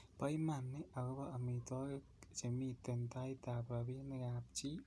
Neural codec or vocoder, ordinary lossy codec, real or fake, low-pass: none; none; real; none